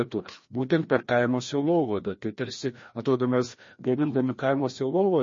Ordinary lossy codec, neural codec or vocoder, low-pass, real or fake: MP3, 32 kbps; codec, 16 kHz, 1 kbps, FreqCodec, larger model; 7.2 kHz; fake